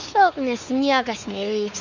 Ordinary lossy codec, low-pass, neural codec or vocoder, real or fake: Opus, 64 kbps; 7.2 kHz; codec, 16 kHz, 4 kbps, X-Codec, WavLM features, trained on Multilingual LibriSpeech; fake